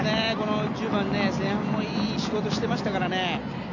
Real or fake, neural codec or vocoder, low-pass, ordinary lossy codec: real; none; 7.2 kHz; none